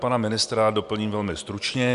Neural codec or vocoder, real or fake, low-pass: none; real; 10.8 kHz